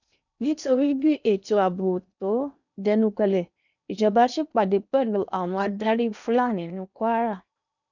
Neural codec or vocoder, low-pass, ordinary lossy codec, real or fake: codec, 16 kHz in and 24 kHz out, 0.8 kbps, FocalCodec, streaming, 65536 codes; 7.2 kHz; none; fake